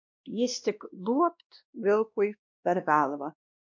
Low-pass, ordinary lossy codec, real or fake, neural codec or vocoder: 7.2 kHz; MP3, 48 kbps; fake; codec, 16 kHz, 1 kbps, X-Codec, WavLM features, trained on Multilingual LibriSpeech